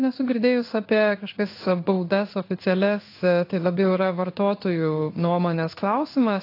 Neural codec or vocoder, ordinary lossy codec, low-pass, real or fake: codec, 16 kHz in and 24 kHz out, 1 kbps, XY-Tokenizer; AAC, 32 kbps; 5.4 kHz; fake